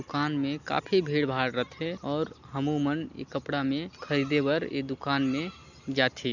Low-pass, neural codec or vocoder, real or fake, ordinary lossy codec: 7.2 kHz; none; real; none